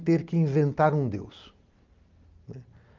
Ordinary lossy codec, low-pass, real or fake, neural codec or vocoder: Opus, 24 kbps; 7.2 kHz; real; none